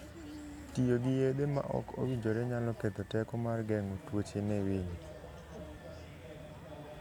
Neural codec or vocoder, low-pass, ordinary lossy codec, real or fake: none; 19.8 kHz; none; real